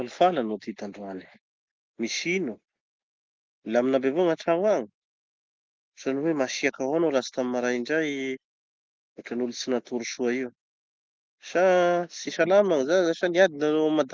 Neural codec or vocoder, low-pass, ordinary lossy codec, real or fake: none; 7.2 kHz; Opus, 32 kbps; real